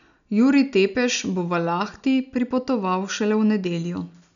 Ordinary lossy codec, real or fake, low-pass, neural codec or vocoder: none; real; 7.2 kHz; none